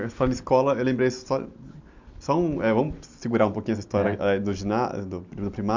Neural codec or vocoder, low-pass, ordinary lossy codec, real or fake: none; 7.2 kHz; MP3, 64 kbps; real